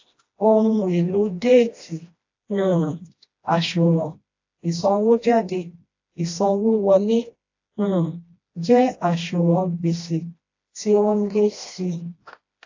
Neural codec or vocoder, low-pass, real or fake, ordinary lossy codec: codec, 16 kHz, 1 kbps, FreqCodec, smaller model; 7.2 kHz; fake; AAC, 48 kbps